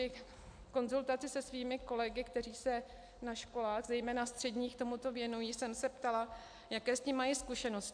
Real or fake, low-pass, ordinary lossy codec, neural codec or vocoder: real; 9.9 kHz; AAC, 64 kbps; none